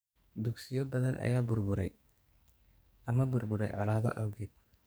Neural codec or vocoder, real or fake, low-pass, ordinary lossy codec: codec, 44.1 kHz, 2.6 kbps, SNAC; fake; none; none